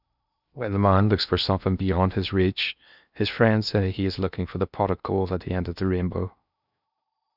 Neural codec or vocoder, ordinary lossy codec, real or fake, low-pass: codec, 16 kHz in and 24 kHz out, 0.8 kbps, FocalCodec, streaming, 65536 codes; none; fake; 5.4 kHz